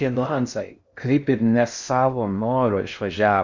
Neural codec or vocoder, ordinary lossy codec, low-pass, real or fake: codec, 16 kHz in and 24 kHz out, 0.6 kbps, FocalCodec, streaming, 2048 codes; Opus, 64 kbps; 7.2 kHz; fake